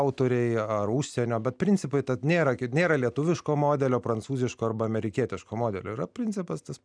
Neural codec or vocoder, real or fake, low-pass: none; real; 9.9 kHz